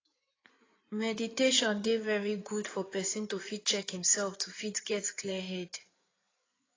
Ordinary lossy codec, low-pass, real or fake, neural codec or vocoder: AAC, 32 kbps; 7.2 kHz; fake; codec, 16 kHz in and 24 kHz out, 2.2 kbps, FireRedTTS-2 codec